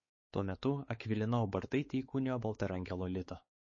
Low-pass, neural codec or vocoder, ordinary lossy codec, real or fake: 7.2 kHz; codec, 16 kHz, 4 kbps, X-Codec, WavLM features, trained on Multilingual LibriSpeech; MP3, 32 kbps; fake